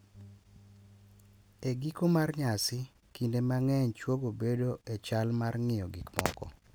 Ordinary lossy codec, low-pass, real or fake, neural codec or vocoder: none; none; real; none